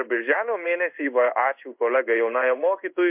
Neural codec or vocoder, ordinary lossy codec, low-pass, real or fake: codec, 16 kHz in and 24 kHz out, 1 kbps, XY-Tokenizer; MP3, 32 kbps; 3.6 kHz; fake